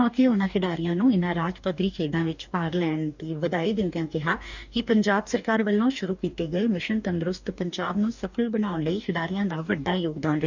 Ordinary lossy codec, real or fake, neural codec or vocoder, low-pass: none; fake; codec, 44.1 kHz, 2.6 kbps, DAC; 7.2 kHz